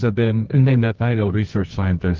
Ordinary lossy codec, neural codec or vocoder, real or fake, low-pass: Opus, 32 kbps; codec, 24 kHz, 0.9 kbps, WavTokenizer, medium music audio release; fake; 7.2 kHz